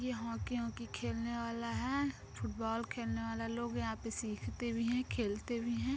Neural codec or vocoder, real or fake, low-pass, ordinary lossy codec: none; real; none; none